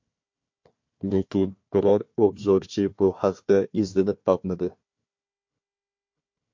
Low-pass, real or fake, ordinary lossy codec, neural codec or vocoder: 7.2 kHz; fake; MP3, 48 kbps; codec, 16 kHz, 1 kbps, FunCodec, trained on Chinese and English, 50 frames a second